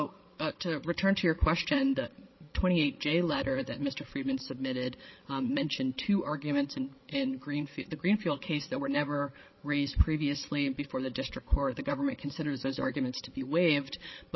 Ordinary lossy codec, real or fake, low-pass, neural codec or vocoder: MP3, 24 kbps; fake; 7.2 kHz; codec, 16 kHz, 8 kbps, FreqCodec, larger model